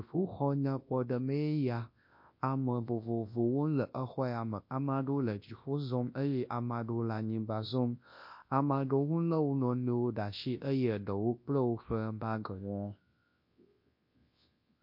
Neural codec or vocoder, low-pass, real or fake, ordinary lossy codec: codec, 24 kHz, 0.9 kbps, WavTokenizer, large speech release; 5.4 kHz; fake; MP3, 32 kbps